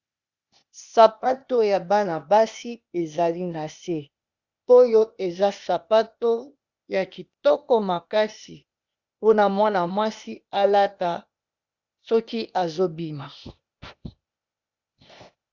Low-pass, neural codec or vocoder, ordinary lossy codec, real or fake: 7.2 kHz; codec, 16 kHz, 0.8 kbps, ZipCodec; Opus, 64 kbps; fake